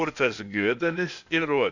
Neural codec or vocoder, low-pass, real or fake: codec, 16 kHz, 0.8 kbps, ZipCodec; 7.2 kHz; fake